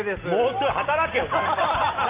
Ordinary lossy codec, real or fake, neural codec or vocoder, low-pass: Opus, 32 kbps; real; none; 3.6 kHz